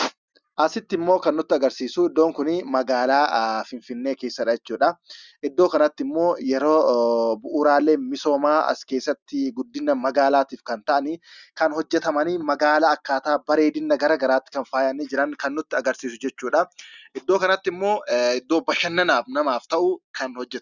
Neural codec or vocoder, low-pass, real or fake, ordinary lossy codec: none; 7.2 kHz; real; Opus, 64 kbps